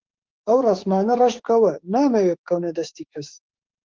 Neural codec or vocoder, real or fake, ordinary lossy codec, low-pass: autoencoder, 48 kHz, 32 numbers a frame, DAC-VAE, trained on Japanese speech; fake; Opus, 16 kbps; 7.2 kHz